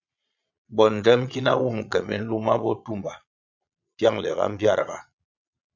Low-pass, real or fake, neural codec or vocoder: 7.2 kHz; fake; vocoder, 22.05 kHz, 80 mel bands, Vocos